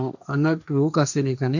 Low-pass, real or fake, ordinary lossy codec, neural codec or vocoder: 7.2 kHz; fake; none; codec, 16 kHz, 1.1 kbps, Voila-Tokenizer